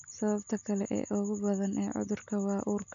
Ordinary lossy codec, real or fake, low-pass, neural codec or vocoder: none; real; 7.2 kHz; none